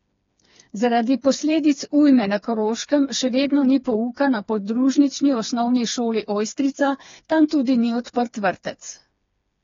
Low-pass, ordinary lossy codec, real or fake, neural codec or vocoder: 7.2 kHz; AAC, 32 kbps; fake; codec, 16 kHz, 4 kbps, FreqCodec, smaller model